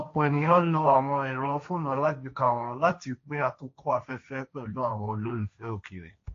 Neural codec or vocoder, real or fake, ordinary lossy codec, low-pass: codec, 16 kHz, 1.1 kbps, Voila-Tokenizer; fake; AAC, 48 kbps; 7.2 kHz